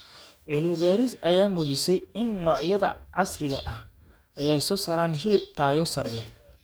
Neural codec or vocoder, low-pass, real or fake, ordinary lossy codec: codec, 44.1 kHz, 2.6 kbps, DAC; none; fake; none